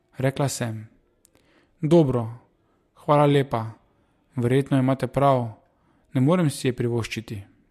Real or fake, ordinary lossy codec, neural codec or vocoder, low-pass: real; MP3, 64 kbps; none; 14.4 kHz